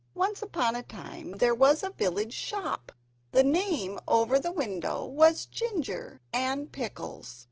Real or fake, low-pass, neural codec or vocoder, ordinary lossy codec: real; 7.2 kHz; none; Opus, 16 kbps